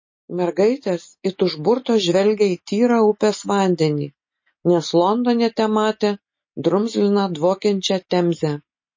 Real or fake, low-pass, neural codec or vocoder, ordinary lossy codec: real; 7.2 kHz; none; MP3, 32 kbps